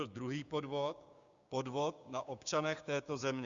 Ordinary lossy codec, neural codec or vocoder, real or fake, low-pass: Opus, 64 kbps; codec, 16 kHz, 6 kbps, DAC; fake; 7.2 kHz